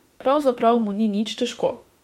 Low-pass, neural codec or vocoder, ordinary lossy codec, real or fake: 19.8 kHz; autoencoder, 48 kHz, 32 numbers a frame, DAC-VAE, trained on Japanese speech; MP3, 64 kbps; fake